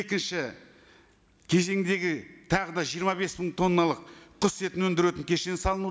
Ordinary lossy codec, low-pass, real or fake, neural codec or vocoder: none; none; real; none